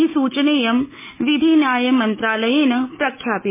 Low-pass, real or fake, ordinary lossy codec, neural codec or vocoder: 3.6 kHz; fake; MP3, 16 kbps; autoencoder, 48 kHz, 128 numbers a frame, DAC-VAE, trained on Japanese speech